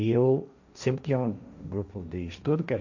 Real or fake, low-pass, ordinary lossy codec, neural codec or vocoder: fake; 7.2 kHz; none; codec, 16 kHz, 1.1 kbps, Voila-Tokenizer